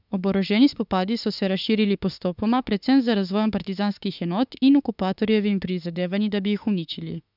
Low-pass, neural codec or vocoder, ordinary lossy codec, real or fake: 5.4 kHz; autoencoder, 48 kHz, 32 numbers a frame, DAC-VAE, trained on Japanese speech; none; fake